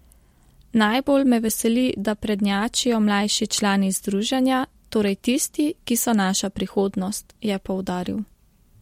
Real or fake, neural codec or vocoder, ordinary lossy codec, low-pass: real; none; MP3, 64 kbps; 19.8 kHz